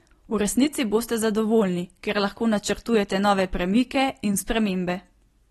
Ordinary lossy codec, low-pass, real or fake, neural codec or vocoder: AAC, 32 kbps; 19.8 kHz; real; none